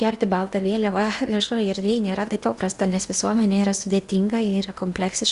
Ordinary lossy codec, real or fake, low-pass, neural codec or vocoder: Opus, 64 kbps; fake; 10.8 kHz; codec, 16 kHz in and 24 kHz out, 0.8 kbps, FocalCodec, streaming, 65536 codes